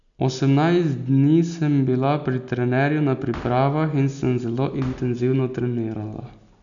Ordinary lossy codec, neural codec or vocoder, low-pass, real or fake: none; none; 7.2 kHz; real